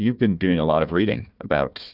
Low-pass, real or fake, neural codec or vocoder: 5.4 kHz; fake; codec, 16 kHz, 1 kbps, FunCodec, trained on Chinese and English, 50 frames a second